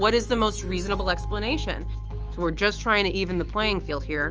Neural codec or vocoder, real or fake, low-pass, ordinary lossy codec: autoencoder, 48 kHz, 128 numbers a frame, DAC-VAE, trained on Japanese speech; fake; 7.2 kHz; Opus, 24 kbps